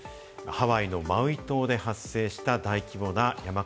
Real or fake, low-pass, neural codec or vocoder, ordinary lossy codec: real; none; none; none